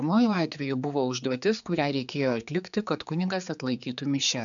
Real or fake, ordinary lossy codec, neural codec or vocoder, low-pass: fake; MP3, 96 kbps; codec, 16 kHz, 4 kbps, X-Codec, HuBERT features, trained on general audio; 7.2 kHz